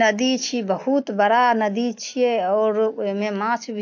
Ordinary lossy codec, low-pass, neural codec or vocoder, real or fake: AAC, 48 kbps; 7.2 kHz; none; real